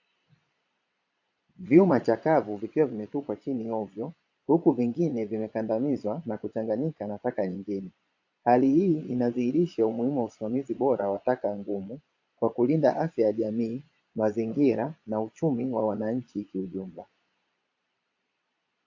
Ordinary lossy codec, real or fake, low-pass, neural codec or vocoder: AAC, 48 kbps; fake; 7.2 kHz; vocoder, 22.05 kHz, 80 mel bands, Vocos